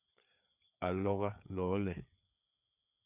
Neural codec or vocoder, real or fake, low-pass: codec, 16 kHz, 4 kbps, FreqCodec, larger model; fake; 3.6 kHz